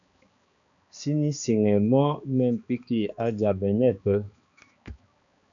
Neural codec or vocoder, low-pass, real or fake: codec, 16 kHz, 2 kbps, X-Codec, HuBERT features, trained on balanced general audio; 7.2 kHz; fake